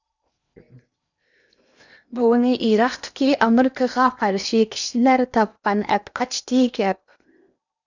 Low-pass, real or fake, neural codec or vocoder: 7.2 kHz; fake; codec, 16 kHz in and 24 kHz out, 0.8 kbps, FocalCodec, streaming, 65536 codes